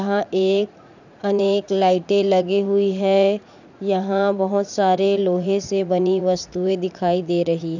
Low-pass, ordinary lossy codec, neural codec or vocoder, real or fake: 7.2 kHz; none; vocoder, 44.1 kHz, 80 mel bands, Vocos; fake